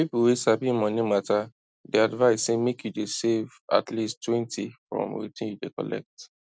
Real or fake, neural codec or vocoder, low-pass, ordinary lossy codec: real; none; none; none